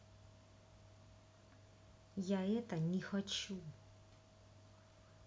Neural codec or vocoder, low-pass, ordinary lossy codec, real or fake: none; none; none; real